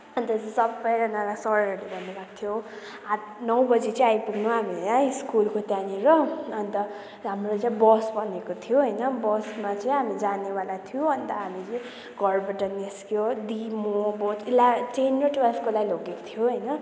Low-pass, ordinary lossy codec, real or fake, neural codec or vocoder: none; none; real; none